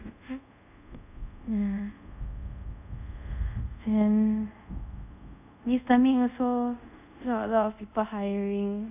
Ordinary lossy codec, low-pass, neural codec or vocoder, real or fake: none; 3.6 kHz; codec, 24 kHz, 0.5 kbps, DualCodec; fake